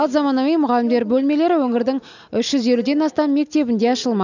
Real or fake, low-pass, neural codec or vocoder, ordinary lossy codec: real; 7.2 kHz; none; none